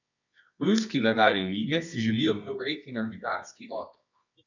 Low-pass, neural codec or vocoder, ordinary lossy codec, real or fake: 7.2 kHz; codec, 24 kHz, 0.9 kbps, WavTokenizer, medium music audio release; none; fake